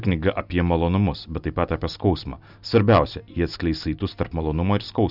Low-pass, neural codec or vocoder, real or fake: 5.4 kHz; none; real